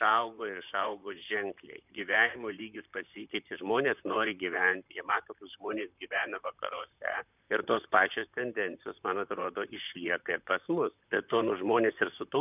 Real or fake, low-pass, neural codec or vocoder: fake; 3.6 kHz; vocoder, 44.1 kHz, 128 mel bands, Pupu-Vocoder